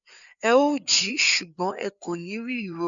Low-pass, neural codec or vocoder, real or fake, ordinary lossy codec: 7.2 kHz; codec, 16 kHz, 4 kbps, FreqCodec, larger model; fake; none